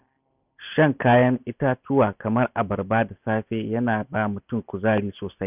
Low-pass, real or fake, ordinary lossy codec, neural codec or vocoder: 3.6 kHz; real; none; none